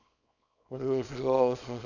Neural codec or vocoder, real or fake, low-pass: codec, 24 kHz, 0.9 kbps, WavTokenizer, small release; fake; 7.2 kHz